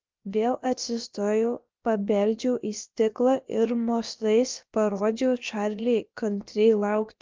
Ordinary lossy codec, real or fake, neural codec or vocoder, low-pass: Opus, 24 kbps; fake; codec, 16 kHz, about 1 kbps, DyCAST, with the encoder's durations; 7.2 kHz